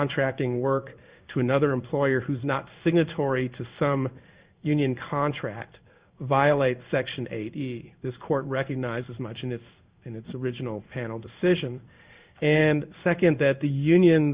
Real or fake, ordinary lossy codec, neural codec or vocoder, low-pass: fake; Opus, 64 kbps; codec, 16 kHz in and 24 kHz out, 1 kbps, XY-Tokenizer; 3.6 kHz